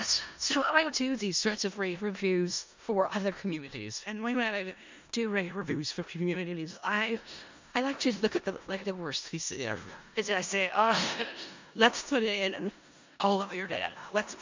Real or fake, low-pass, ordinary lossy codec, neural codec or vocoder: fake; 7.2 kHz; MP3, 64 kbps; codec, 16 kHz in and 24 kHz out, 0.4 kbps, LongCat-Audio-Codec, four codebook decoder